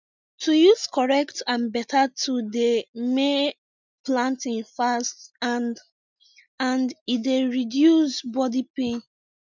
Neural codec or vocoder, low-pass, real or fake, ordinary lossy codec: none; 7.2 kHz; real; none